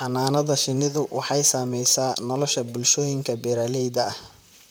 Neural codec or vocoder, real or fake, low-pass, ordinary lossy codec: vocoder, 44.1 kHz, 128 mel bands, Pupu-Vocoder; fake; none; none